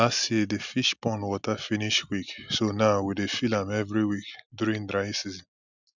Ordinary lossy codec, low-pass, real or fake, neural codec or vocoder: none; 7.2 kHz; real; none